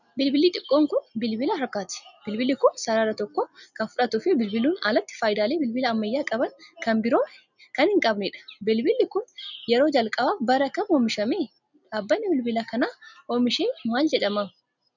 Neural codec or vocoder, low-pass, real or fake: none; 7.2 kHz; real